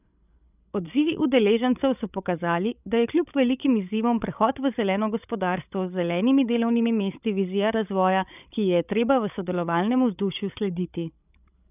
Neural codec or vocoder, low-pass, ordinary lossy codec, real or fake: codec, 16 kHz, 16 kbps, FreqCodec, larger model; 3.6 kHz; none; fake